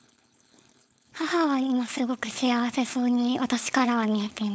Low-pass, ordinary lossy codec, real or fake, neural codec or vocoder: none; none; fake; codec, 16 kHz, 4.8 kbps, FACodec